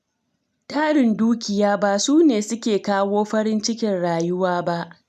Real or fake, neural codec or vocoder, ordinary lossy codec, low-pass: real; none; none; 14.4 kHz